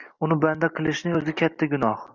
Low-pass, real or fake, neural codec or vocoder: 7.2 kHz; real; none